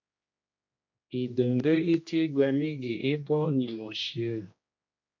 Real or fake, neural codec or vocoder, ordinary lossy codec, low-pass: fake; codec, 16 kHz, 1 kbps, X-Codec, HuBERT features, trained on general audio; MP3, 48 kbps; 7.2 kHz